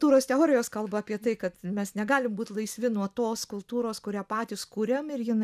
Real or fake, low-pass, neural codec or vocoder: real; 14.4 kHz; none